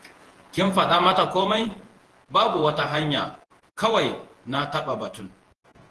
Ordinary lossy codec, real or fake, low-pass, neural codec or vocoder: Opus, 16 kbps; fake; 10.8 kHz; vocoder, 48 kHz, 128 mel bands, Vocos